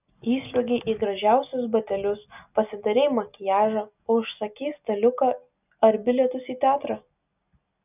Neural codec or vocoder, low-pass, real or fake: none; 3.6 kHz; real